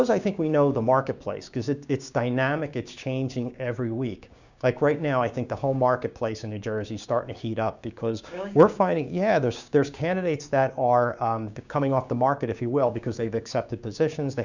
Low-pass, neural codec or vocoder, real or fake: 7.2 kHz; codec, 16 kHz, 6 kbps, DAC; fake